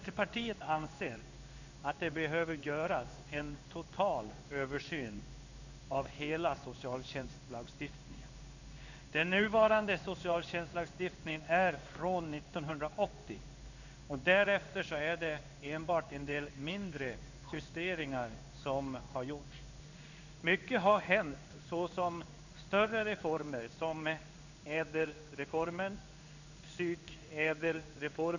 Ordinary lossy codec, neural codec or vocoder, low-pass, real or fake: none; codec, 16 kHz in and 24 kHz out, 1 kbps, XY-Tokenizer; 7.2 kHz; fake